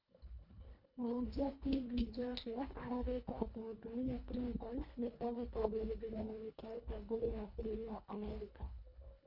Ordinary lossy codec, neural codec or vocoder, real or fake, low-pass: none; codec, 24 kHz, 1.5 kbps, HILCodec; fake; 5.4 kHz